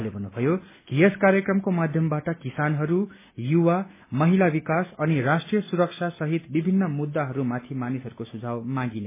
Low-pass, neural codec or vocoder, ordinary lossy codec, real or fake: 3.6 kHz; none; MP3, 16 kbps; real